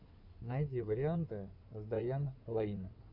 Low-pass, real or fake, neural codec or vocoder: 5.4 kHz; fake; codec, 16 kHz in and 24 kHz out, 2.2 kbps, FireRedTTS-2 codec